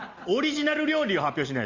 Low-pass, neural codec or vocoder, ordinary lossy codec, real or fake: 7.2 kHz; none; Opus, 32 kbps; real